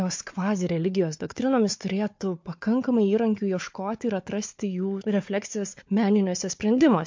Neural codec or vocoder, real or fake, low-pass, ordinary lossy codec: codec, 16 kHz, 4 kbps, FunCodec, trained on Chinese and English, 50 frames a second; fake; 7.2 kHz; MP3, 48 kbps